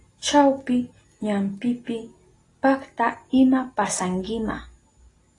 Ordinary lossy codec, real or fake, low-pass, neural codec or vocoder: AAC, 32 kbps; real; 10.8 kHz; none